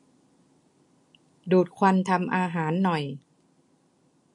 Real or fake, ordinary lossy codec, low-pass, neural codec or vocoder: real; MP3, 48 kbps; 10.8 kHz; none